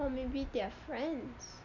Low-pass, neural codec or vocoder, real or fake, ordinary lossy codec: 7.2 kHz; none; real; none